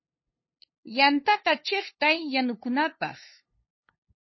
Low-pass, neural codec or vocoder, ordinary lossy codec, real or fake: 7.2 kHz; codec, 16 kHz, 2 kbps, FunCodec, trained on LibriTTS, 25 frames a second; MP3, 24 kbps; fake